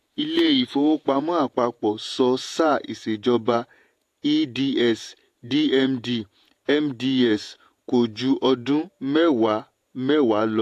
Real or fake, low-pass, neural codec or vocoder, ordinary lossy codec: fake; 14.4 kHz; vocoder, 48 kHz, 128 mel bands, Vocos; AAC, 64 kbps